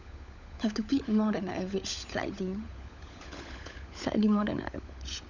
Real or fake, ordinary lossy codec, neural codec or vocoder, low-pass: fake; none; codec, 16 kHz, 16 kbps, FunCodec, trained on LibriTTS, 50 frames a second; 7.2 kHz